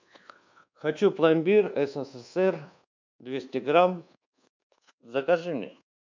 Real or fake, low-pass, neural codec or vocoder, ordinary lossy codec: fake; 7.2 kHz; codec, 24 kHz, 1.2 kbps, DualCodec; MP3, 64 kbps